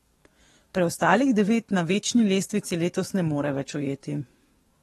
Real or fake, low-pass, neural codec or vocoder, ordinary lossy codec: fake; 19.8 kHz; codec, 44.1 kHz, 7.8 kbps, DAC; AAC, 32 kbps